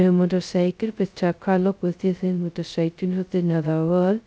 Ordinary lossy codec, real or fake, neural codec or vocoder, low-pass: none; fake; codec, 16 kHz, 0.2 kbps, FocalCodec; none